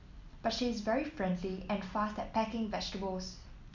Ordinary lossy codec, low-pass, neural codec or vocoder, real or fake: none; 7.2 kHz; none; real